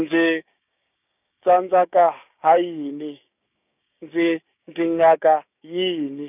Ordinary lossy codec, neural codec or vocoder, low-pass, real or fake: none; codec, 16 kHz, 6 kbps, DAC; 3.6 kHz; fake